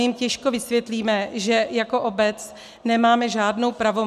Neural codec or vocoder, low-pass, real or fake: none; 14.4 kHz; real